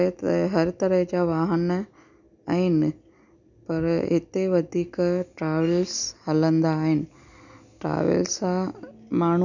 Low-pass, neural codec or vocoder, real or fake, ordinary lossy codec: 7.2 kHz; none; real; none